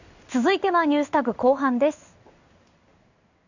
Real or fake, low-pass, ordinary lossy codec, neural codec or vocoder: fake; 7.2 kHz; none; codec, 16 kHz in and 24 kHz out, 1 kbps, XY-Tokenizer